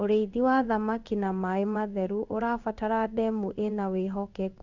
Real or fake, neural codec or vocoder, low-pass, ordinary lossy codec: fake; codec, 16 kHz in and 24 kHz out, 1 kbps, XY-Tokenizer; 7.2 kHz; Opus, 64 kbps